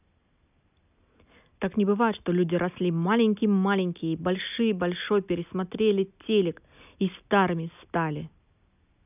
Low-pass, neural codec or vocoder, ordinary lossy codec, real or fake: 3.6 kHz; none; none; real